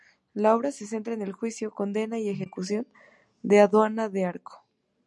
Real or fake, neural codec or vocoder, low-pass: real; none; 10.8 kHz